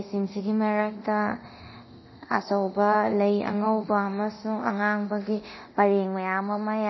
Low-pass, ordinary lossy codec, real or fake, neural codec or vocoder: 7.2 kHz; MP3, 24 kbps; fake; codec, 24 kHz, 0.9 kbps, DualCodec